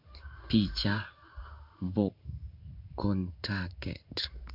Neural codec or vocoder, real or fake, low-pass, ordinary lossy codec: codec, 16 kHz in and 24 kHz out, 1 kbps, XY-Tokenizer; fake; 5.4 kHz; none